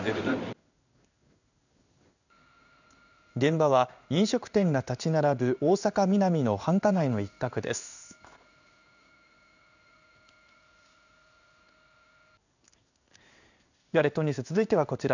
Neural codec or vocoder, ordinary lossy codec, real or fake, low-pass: codec, 16 kHz in and 24 kHz out, 1 kbps, XY-Tokenizer; none; fake; 7.2 kHz